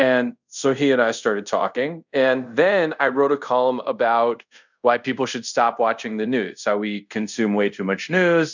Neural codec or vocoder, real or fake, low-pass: codec, 24 kHz, 0.5 kbps, DualCodec; fake; 7.2 kHz